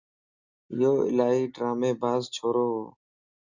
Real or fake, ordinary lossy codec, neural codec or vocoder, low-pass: real; Opus, 64 kbps; none; 7.2 kHz